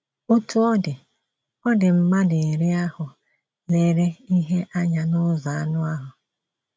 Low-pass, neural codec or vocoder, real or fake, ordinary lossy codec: none; none; real; none